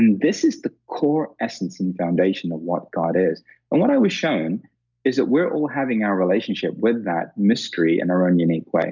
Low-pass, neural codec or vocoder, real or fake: 7.2 kHz; none; real